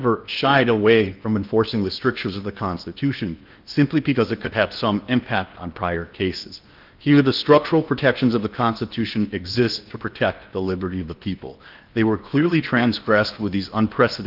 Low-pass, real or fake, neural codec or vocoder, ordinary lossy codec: 5.4 kHz; fake; codec, 16 kHz in and 24 kHz out, 0.8 kbps, FocalCodec, streaming, 65536 codes; Opus, 32 kbps